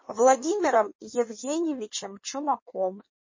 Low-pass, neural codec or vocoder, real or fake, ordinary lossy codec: 7.2 kHz; codec, 16 kHz in and 24 kHz out, 1.1 kbps, FireRedTTS-2 codec; fake; MP3, 32 kbps